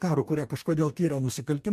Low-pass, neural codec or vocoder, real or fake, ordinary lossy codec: 14.4 kHz; codec, 44.1 kHz, 2.6 kbps, DAC; fake; MP3, 64 kbps